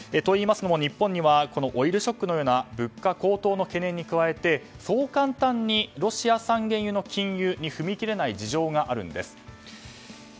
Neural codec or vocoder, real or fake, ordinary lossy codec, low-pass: none; real; none; none